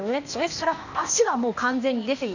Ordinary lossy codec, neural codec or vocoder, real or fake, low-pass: none; codec, 16 kHz, 0.8 kbps, ZipCodec; fake; 7.2 kHz